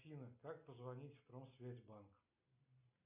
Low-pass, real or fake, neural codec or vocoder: 3.6 kHz; real; none